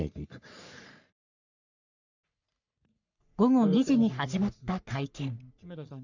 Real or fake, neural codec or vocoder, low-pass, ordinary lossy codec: fake; codec, 44.1 kHz, 3.4 kbps, Pupu-Codec; 7.2 kHz; none